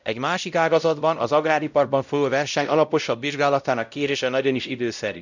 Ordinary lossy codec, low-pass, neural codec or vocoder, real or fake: none; 7.2 kHz; codec, 16 kHz, 0.5 kbps, X-Codec, WavLM features, trained on Multilingual LibriSpeech; fake